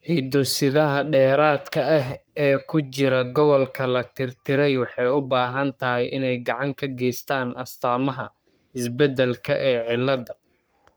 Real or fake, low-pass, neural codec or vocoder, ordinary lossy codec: fake; none; codec, 44.1 kHz, 3.4 kbps, Pupu-Codec; none